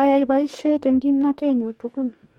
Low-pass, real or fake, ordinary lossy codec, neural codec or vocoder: 19.8 kHz; fake; MP3, 64 kbps; codec, 44.1 kHz, 2.6 kbps, DAC